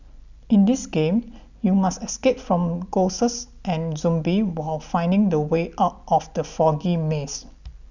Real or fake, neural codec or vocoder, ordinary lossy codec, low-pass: real; none; none; 7.2 kHz